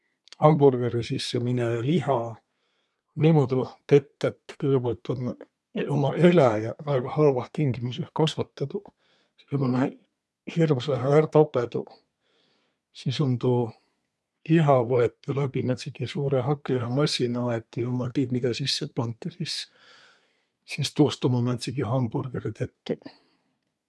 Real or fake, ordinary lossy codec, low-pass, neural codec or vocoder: fake; none; none; codec, 24 kHz, 1 kbps, SNAC